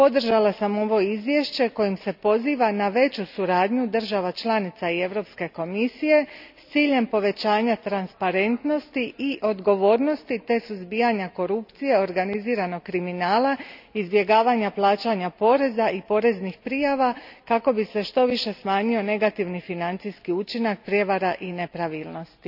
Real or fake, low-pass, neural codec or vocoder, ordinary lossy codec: real; 5.4 kHz; none; none